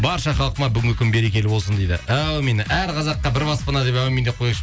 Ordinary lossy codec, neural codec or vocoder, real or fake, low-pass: none; none; real; none